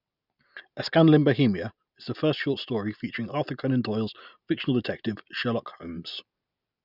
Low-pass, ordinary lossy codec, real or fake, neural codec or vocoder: 5.4 kHz; none; real; none